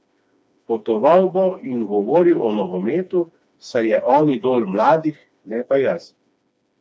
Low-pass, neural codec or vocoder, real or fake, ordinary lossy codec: none; codec, 16 kHz, 2 kbps, FreqCodec, smaller model; fake; none